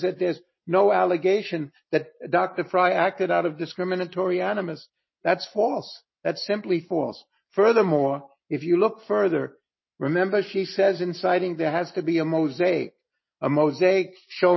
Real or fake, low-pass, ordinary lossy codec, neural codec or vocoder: real; 7.2 kHz; MP3, 24 kbps; none